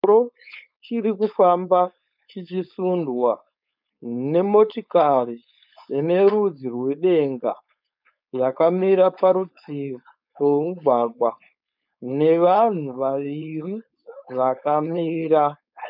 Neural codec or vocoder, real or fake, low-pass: codec, 16 kHz, 4.8 kbps, FACodec; fake; 5.4 kHz